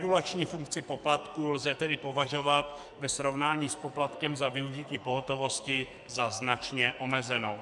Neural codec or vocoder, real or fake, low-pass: codec, 44.1 kHz, 2.6 kbps, SNAC; fake; 10.8 kHz